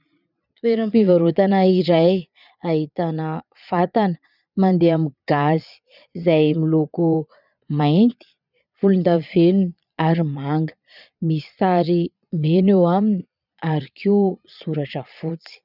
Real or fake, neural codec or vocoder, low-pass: fake; vocoder, 22.05 kHz, 80 mel bands, Vocos; 5.4 kHz